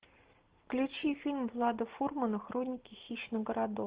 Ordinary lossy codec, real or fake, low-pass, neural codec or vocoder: Opus, 16 kbps; real; 3.6 kHz; none